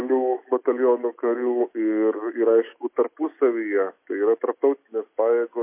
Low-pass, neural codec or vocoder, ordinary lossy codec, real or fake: 3.6 kHz; none; MP3, 24 kbps; real